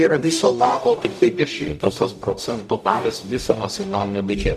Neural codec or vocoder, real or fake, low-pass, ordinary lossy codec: codec, 44.1 kHz, 0.9 kbps, DAC; fake; 14.4 kHz; MP3, 96 kbps